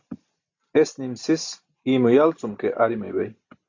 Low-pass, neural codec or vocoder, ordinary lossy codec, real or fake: 7.2 kHz; none; AAC, 48 kbps; real